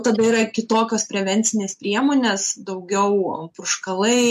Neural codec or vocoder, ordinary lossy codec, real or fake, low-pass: none; MP3, 64 kbps; real; 14.4 kHz